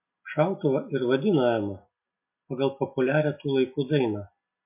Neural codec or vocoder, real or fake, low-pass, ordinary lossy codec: none; real; 3.6 kHz; MP3, 32 kbps